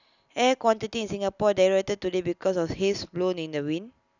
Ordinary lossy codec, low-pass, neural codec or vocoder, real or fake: none; 7.2 kHz; none; real